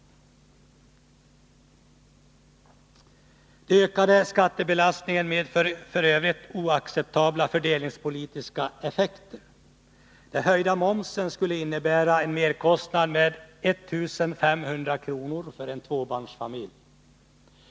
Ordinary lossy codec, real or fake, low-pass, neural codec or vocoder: none; real; none; none